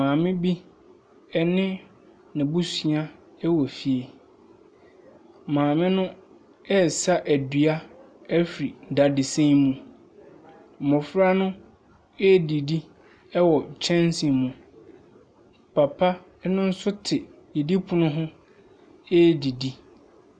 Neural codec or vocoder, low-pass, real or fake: none; 9.9 kHz; real